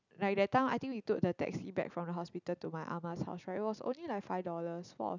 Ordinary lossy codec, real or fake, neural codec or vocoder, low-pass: none; real; none; 7.2 kHz